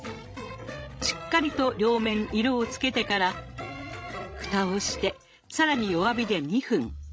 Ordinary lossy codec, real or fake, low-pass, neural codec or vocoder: none; fake; none; codec, 16 kHz, 16 kbps, FreqCodec, larger model